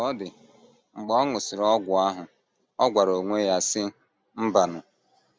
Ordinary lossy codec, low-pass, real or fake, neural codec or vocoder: none; none; real; none